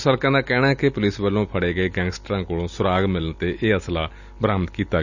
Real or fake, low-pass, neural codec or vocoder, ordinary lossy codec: real; 7.2 kHz; none; none